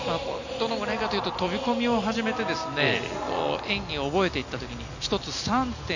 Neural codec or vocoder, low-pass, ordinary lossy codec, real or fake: none; 7.2 kHz; none; real